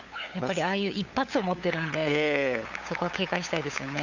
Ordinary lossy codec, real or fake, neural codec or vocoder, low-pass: Opus, 64 kbps; fake; codec, 16 kHz, 8 kbps, FunCodec, trained on LibriTTS, 25 frames a second; 7.2 kHz